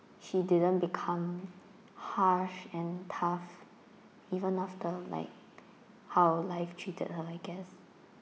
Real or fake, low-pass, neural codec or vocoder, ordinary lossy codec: real; none; none; none